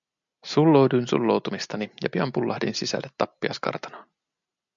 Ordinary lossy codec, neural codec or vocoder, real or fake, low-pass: MP3, 96 kbps; none; real; 7.2 kHz